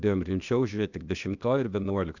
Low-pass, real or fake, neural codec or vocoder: 7.2 kHz; fake; codec, 16 kHz, 0.8 kbps, ZipCodec